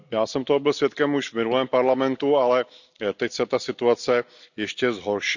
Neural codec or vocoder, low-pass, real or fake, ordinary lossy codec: none; 7.2 kHz; real; none